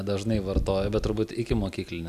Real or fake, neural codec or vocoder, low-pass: real; none; 14.4 kHz